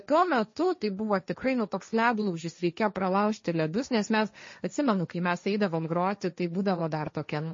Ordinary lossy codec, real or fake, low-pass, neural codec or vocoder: MP3, 32 kbps; fake; 7.2 kHz; codec, 16 kHz, 1.1 kbps, Voila-Tokenizer